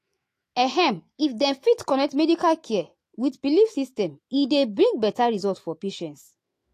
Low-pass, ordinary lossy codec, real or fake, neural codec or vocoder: 14.4 kHz; AAC, 48 kbps; fake; autoencoder, 48 kHz, 128 numbers a frame, DAC-VAE, trained on Japanese speech